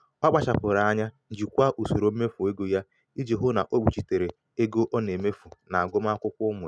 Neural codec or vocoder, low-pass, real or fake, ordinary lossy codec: none; none; real; none